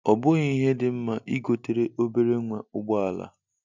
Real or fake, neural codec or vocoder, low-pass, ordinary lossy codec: real; none; 7.2 kHz; none